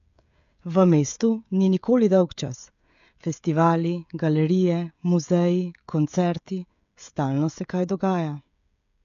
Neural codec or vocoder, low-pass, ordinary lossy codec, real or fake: codec, 16 kHz, 16 kbps, FreqCodec, smaller model; 7.2 kHz; none; fake